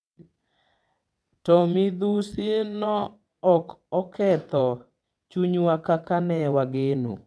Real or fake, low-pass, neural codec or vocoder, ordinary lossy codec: fake; none; vocoder, 22.05 kHz, 80 mel bands, WaveNeXt; none